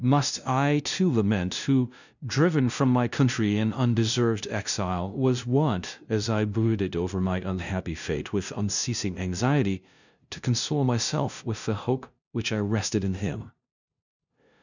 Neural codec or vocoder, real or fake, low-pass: codec, 16 kHz, 0.5 kbps, FunCodec, trained on LibriTTS, 25 frames a second; fake; 7.2 kHz